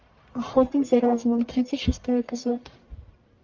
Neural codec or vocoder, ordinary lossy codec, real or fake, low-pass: codec, 44.1 kHz, 1.7 kbps, Pupu-Codec; Opus, 32 kbps; fake; 7.2 kHz